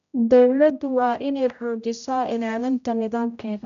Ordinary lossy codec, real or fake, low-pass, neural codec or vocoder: none; fake; 7.2 kHz; codec, 16 kHz, 0.5 kbps, X-Codec, HuBERT features, trained on general audio